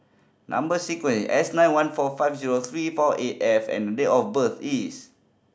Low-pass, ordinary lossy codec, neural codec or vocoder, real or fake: none; none; none; real